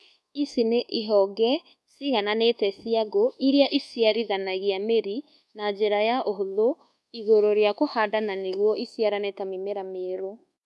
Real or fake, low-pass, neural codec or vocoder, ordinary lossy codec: fake; none; codec, 24 kHz, 1.2 kbps, DualCodec; none